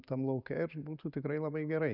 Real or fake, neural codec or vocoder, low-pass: real; none; 5.4 kHz